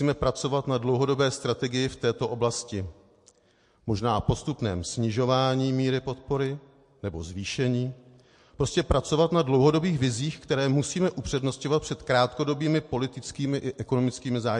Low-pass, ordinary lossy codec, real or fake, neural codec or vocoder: 10.8 kHz; MP3, 48 kbps; real; none